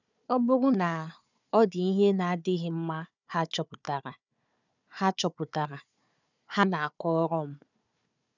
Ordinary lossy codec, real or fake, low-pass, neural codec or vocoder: none; fake; 7.2 kHz; codec, 16 kHz, 4 kbps, FunCodec, trained on Chinese and English, 50 frames a second